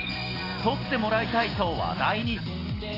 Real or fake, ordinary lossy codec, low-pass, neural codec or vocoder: real; AAC, 24 kbps; 5.4 kHz; none